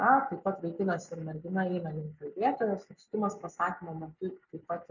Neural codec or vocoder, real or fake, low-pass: none; real; 7.2 kHz